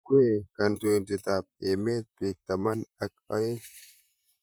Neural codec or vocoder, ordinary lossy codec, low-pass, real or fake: vocoder, 44.1 kHz, 128 mel bands, Pupu-Vocoder; none; none; fake